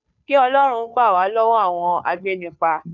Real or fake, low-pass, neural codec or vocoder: fake; 7.2 kHz; codec, 16 kHz, 2 kbps, FunCodec, trained on Chinese and English, 25 frames a second